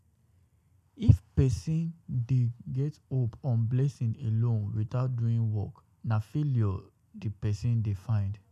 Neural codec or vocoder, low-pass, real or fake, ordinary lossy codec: none; 14.4 kHz; real; MP3, 96 kbps